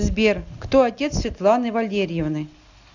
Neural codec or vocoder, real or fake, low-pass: none; real; 7.2 kHz